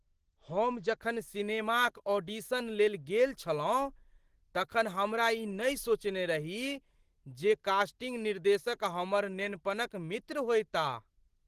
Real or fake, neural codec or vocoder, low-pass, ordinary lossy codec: real; none; 14.4 kHz; Opus, 16 kbps